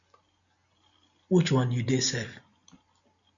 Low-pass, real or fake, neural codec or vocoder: 7.2 kHz; real; none